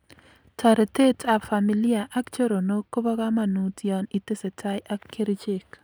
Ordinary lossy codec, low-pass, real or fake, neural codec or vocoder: none; none; real; none